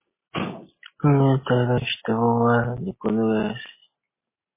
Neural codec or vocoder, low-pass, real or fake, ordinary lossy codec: none; 3.6 kHz; real; MP3, 16 kbps